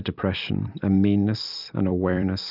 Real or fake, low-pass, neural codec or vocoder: fake; 5.4 kHz; vocoder, 44.1 kHz, 128 mel bands every 256 samples, BigVGAN v2